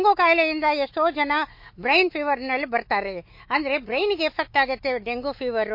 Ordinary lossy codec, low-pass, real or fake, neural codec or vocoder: MP3, 32 kbps; 5.4 kHz; fake; autoencoder, 48 kHz, 128 numbers a frame, DAC-VAE, trained on Japanese speech